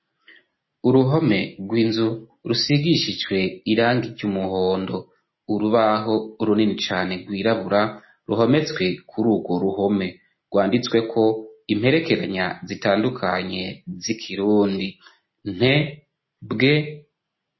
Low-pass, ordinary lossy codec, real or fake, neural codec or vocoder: 7.2 kHz; MP3, 24 kbps; real; none